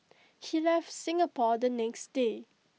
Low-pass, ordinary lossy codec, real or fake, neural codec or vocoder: none; none; real; none